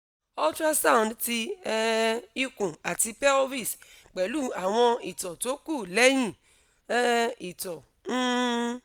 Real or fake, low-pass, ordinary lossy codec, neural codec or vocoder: real; none; none; none